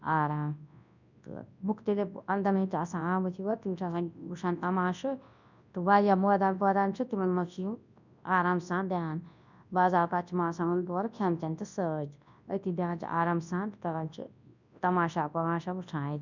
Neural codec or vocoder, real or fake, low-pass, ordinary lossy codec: codec, 24 kHz, 0.9 kbps, WavTokenizer, large speech release; fake; 7.2 kHz; none